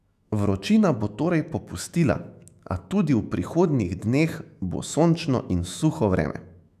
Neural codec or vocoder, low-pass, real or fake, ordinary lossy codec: autoencoder, 48 kHz, 128 numbers a frame, DAC-VAE, trained on Japanese speech; 14.4 kHz; fake; none